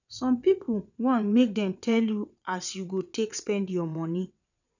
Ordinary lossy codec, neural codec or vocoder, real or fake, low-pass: none; vocoder, 22.05 kHz, 80 mel bands, WaveNeXt; fake; 7.2 kHz